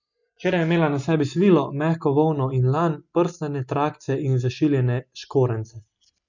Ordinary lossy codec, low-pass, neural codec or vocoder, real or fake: none; 7.2 kHz; none; real